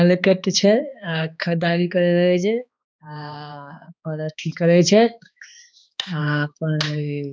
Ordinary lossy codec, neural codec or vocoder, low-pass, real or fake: none; codec, 16 kHz, 2 kbps, X-Codec, HuBERT features, trained on balanced general audio; none; fake